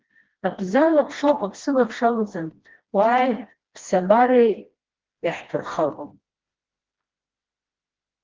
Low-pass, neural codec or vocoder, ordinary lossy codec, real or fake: 7.2 kHz; codec, 16 kHz, 1 kbps, FreqCodec, smaller model; Opus, 16 kbps; fake